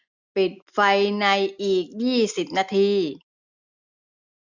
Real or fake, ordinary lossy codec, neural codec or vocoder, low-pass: real; none; none; 7.2 kHz